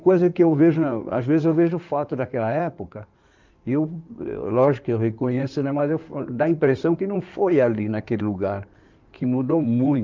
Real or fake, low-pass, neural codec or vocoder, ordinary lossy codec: fake; 7.2 kHz; codec, 16 kHz in and 24 kHz out, 2.2 kbps, FireRedTTS-2 codec; Opus, 24 kbps